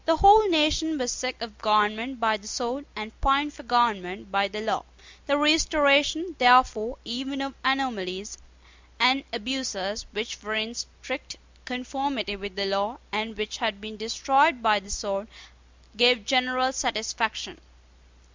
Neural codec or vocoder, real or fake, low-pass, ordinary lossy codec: none; real; 7.2 kHz; MP3, 64 kbps